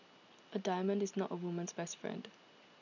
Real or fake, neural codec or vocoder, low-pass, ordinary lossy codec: real; none; 7.2 kHz; none